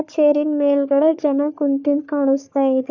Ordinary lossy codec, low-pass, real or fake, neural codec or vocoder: none; 7.2 kHz; fake; codec, 44.1 kHz, 3.4 kbps, Pupu-Codec